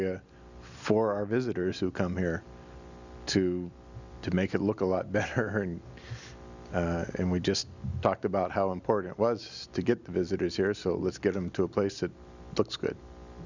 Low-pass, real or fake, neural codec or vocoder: 7.2 kHz; real; none